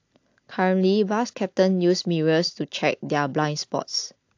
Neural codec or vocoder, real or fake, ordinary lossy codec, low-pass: none; real; AAC, 48 kbps; 7.2 kHz